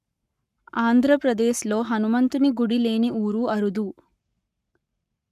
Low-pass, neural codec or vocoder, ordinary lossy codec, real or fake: 14.4 kHz; codec, 44.1 kHz, 7.8 kbps, Pupu-Codec; none; fake